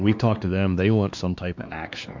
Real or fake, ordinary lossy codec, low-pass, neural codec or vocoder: fake; MP3, 64 kbps; 7.2 kHz; codec, 16 kHz, 2 kbps, X-Codec, WavLM features, trained on Multilingual LibriSpeech